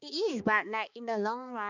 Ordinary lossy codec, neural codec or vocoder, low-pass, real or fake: none; codec, 16 kHz, 2 kbps, X-Codec, HuBERT features, trained on balanced general audio; 7.2 kHz; fake